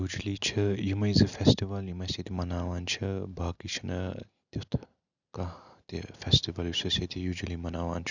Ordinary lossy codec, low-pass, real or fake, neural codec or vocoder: none; 7.2 kHz; real; none